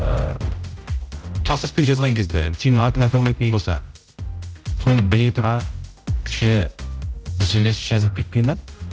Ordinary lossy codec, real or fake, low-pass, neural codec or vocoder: none; fake; none; codec, 16 kHz, 0.5 kbps, X-Codec, HuBERT features, trained on general audio